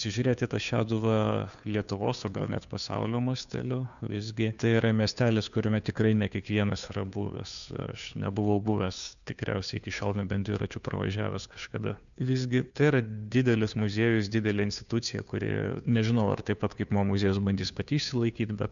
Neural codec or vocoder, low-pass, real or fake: codec, 16 kHz, 2 kbps, FunCodec, trained on Chinese and English, 25 frames a second; 7.2 kHz; fake